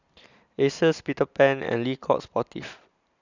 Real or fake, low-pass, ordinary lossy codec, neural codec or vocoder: real; 7.2 kHz; none; none